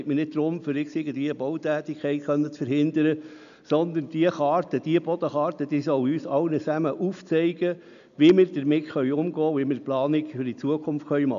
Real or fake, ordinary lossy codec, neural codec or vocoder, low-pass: real; none; none; 7.2 kHz